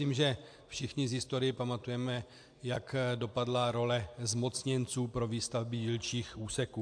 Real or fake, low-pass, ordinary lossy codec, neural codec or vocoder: real; 9.9 kHz; AAC, 64 kbps; none